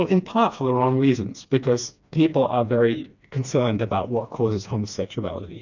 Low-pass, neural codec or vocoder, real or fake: 7.2 kHz; codec, 16 kHz, 2 kbps, FreqCodec, smaller model; fake